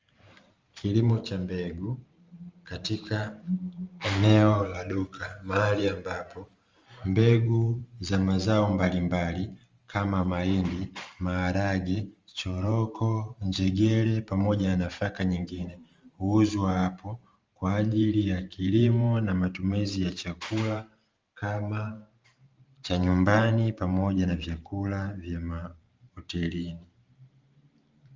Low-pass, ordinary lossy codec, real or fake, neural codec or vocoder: 7.2 kHz; Opus, 32 kbps; fake; vocoder, 44.1 kHz, 128 mel bands every 512 samples, BigVGAN v2